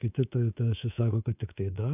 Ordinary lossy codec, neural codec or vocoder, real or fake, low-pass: AAC, 32 kbps; codec, 24 kHz, 3.1 kbps, DualCodec; fake; 3.6 kHz